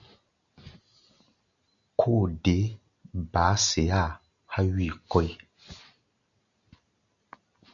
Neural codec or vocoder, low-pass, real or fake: none; 7.2 kHz; real